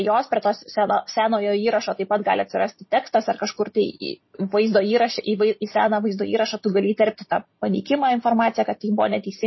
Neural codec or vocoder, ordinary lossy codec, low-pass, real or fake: none; MP3, 24 kbps; 7.2 kHz; real